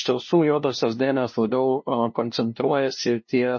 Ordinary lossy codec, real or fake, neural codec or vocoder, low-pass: MP3, 32 kbps; fake; codec, 16 kHz, 0.5 kbps, FunCodec, trained on LibriTTS, 25 frames a second; 7.2 kHz